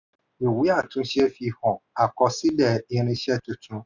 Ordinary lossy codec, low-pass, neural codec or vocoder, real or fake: none; 7.2 kHz; none; real